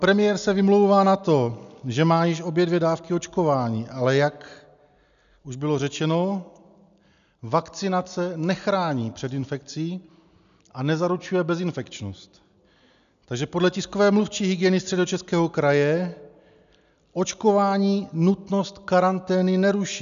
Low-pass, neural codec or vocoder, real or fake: 7.2 kHz; none; real